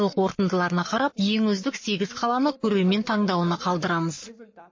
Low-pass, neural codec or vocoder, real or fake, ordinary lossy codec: 7.2 kHz; codec, 44.1 kHz, 7.8 kbps, Pupu-Codec; fake; MP3, 32 kbps